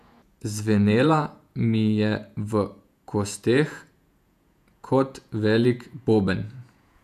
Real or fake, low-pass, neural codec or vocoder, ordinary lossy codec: fake; 14.4 kHz; vocoder, 48 kHz, 128 mel bands, Vocos; none